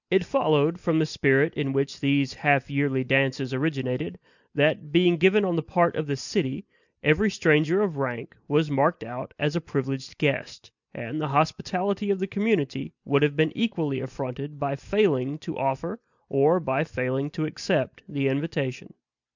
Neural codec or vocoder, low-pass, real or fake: none; 7.2 kHz; real